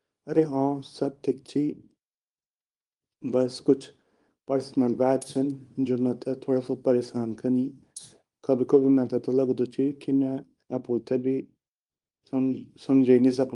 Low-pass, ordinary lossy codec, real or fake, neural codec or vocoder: 10.8 kHz; Opus, 32 kbps; fake; codec, 24 kHz, 0.9 kbps, WavTokenizer, small release